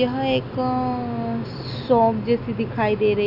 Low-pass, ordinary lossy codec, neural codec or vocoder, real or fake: 5.4 kHz; none; none; real